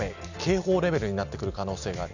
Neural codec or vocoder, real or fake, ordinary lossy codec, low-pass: none; real; none; 7.2 kHz